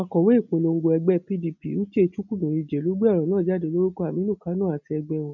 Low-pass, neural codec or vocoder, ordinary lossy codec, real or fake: 7.2 kHz; none; none; real